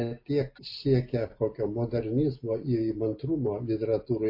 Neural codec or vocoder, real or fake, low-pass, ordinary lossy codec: none; real; 5.4 kHz; MP3, 32 kbps